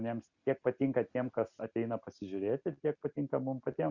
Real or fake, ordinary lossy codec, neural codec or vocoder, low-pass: real; Opus, 64 kbps; none; 7.2 kHz